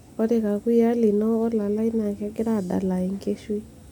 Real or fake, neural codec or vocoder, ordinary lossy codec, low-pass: real; none; none; none